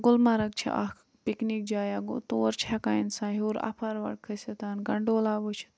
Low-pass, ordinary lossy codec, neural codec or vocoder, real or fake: none; none; none; real